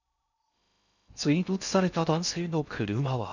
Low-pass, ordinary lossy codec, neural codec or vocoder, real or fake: 7.2 kHz; none; codec, 16 kHz in and 24 kHz out, 0.6 kbps, FocalCodec, streaming, 2048 codes; fake